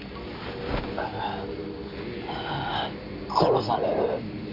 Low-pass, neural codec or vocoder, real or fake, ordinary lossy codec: 5.4 kHz; none; real; none